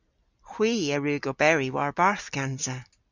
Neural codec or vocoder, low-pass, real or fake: none; 7.2 kHz; real